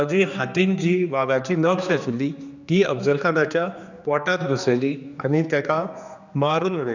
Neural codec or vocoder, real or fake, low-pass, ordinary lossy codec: codec, 16 kHz, 2 kbps, X-Codec, HuBERT features, trained on general audio; fake; 7.2 kHz; none